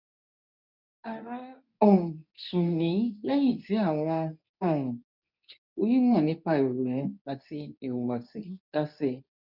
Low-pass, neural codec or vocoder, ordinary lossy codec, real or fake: 5.4 kHz; codec, 24 kHz, 0.9 kbps, WavTokenizer, medium speech release version 2; none; fake